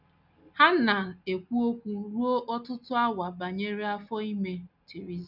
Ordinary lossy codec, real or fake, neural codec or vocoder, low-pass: none; real; none; 5.4 kHz